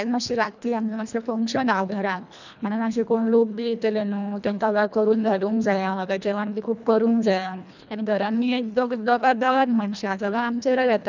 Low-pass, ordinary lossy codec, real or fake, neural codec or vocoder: 7.2 kHz; none; fake; codec, 24 kHz, 1.5 kbps, HILCodec